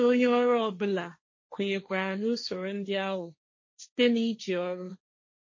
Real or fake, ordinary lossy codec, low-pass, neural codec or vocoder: fake; MP3, 32 kbps; 7.2 kHz; codec, 16 kHz, 1.1 kbps, Voila-Tokenizer